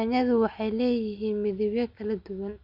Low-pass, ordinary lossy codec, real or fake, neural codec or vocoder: 5.4 kHz; Opus, 64 kbps; real; none